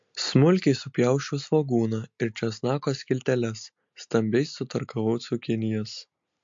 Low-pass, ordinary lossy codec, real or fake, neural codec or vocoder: 7.2 kHz; MP3, 48 kbps; real; none